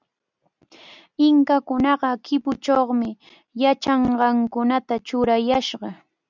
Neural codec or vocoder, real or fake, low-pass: none; real; 7.2 kHz